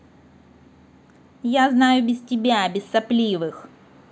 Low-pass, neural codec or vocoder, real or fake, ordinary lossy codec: none; none; real; none